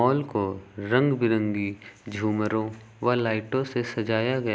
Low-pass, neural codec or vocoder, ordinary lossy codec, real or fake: none; none; none; real